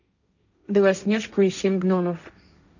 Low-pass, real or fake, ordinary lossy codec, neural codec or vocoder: 7.2 kHz; fake; none; codec, 16 kHz, 1.1 kbps, Voila-Tokenizer